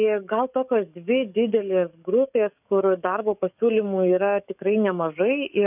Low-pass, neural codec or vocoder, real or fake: 3.6 kHz; codec, 44.1 kHz, 7.8 kbps, DAC; fake